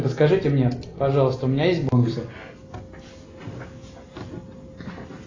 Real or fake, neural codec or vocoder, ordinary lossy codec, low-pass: real; none; AAC, 32 kbps; 7.2 kHz